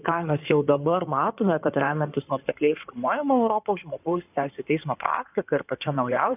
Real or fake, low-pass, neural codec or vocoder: fake; 3.6 kHz; codec, 16 kHz in and 24 kHz out, 2.2 kbps, FireRedTTS-2 codec